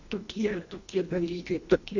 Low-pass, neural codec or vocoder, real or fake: 7.2 kHz; codec, 24 kHz, 1.5 kbps, HILCodec; fake